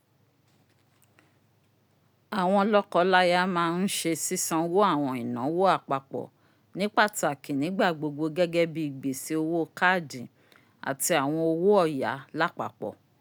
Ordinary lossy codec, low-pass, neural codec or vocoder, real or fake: none; none; none; real